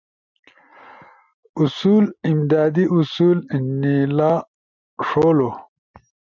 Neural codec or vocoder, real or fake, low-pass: none; real; 7.2 kHz